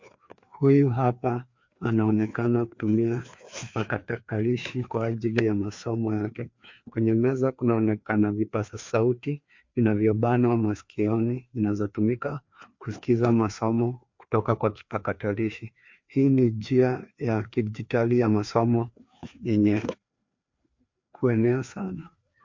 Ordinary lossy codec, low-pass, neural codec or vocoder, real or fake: MP3, 48 kbps; 7.2 kHz; codec, 16 kHz, 2 kbps, FreqCodec, larger model; fake